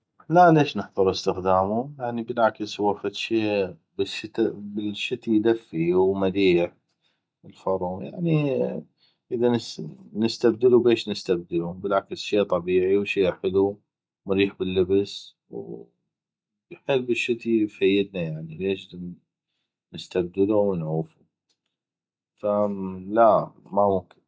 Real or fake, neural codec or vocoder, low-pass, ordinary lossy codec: real; none; none; none